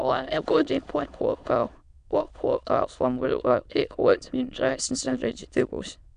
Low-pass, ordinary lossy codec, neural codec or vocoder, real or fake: 9.9 kHz; none; autoencoder, 22.05 kHz, a latent of 192 numbers a frame, VITS, trained on many speakers; fake